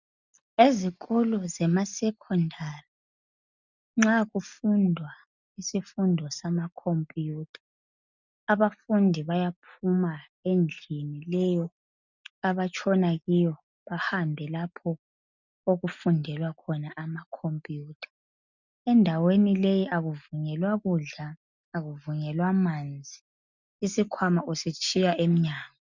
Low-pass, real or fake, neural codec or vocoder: 7.2 kHz; real; none